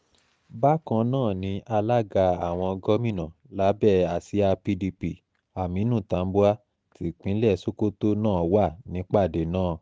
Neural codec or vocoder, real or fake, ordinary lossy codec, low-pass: none; real; none; none